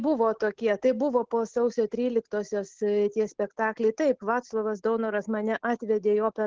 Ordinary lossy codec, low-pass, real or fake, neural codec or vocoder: Opus, 16 kbps; 7.2 kHz; real; none